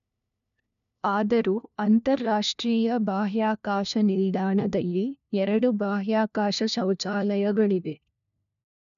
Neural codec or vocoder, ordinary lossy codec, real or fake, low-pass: codec, 16 kHz, 1 kbps, FunCodec, trained on LibriTTS, 50 frames a second; none; fake; 7.2 kHz